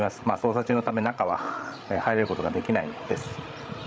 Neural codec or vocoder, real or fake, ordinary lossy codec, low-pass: codec, 16 kHz, 16 kbps, FreqCodec, larger model; fake; none; none